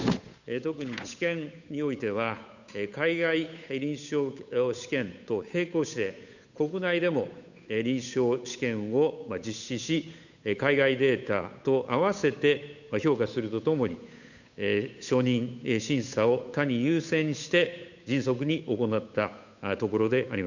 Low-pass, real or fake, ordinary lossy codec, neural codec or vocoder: 7.2 kHz; fake; none; codec, 16 kHz, 8 kbps, FunCodec, trained on Chinese and English, 25 frames a second